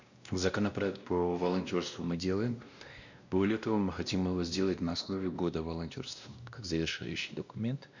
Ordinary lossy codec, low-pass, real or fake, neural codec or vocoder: none; 7.2 kHz; fake; codec, 16 kHz, 1 kbps, X-Codec, WavLM features, trained on Multilingual LibriSpeech